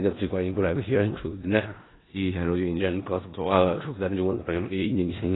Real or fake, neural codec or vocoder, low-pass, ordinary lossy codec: fake; codec, 16 kHz in and 24 kHz out, 0.4 kbps, LongCat-Audio-Codec, four codebook decoder; 7.2 kHz; AAC, 16 kbps